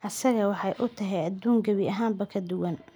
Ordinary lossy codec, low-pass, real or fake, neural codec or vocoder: none; none; real; none